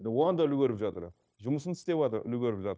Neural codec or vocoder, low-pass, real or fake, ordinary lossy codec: codec, 16 kHz, 0.9 kbps, LongCat-Audio-Codec; none; fake; none